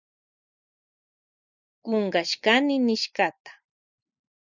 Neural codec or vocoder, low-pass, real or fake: none; 7.2 kHz; real